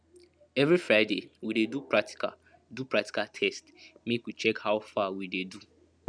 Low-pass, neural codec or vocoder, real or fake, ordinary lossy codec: 9.9 kHz; none; real; none